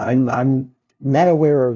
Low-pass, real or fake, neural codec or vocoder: 7.2 kHz; fake; codec, 16 kHz, 0.5 kbps, FunCodec, trained on LibriTTS, 25 frames a second